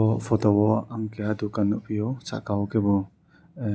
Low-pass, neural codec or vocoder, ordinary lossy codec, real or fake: none; none; none; real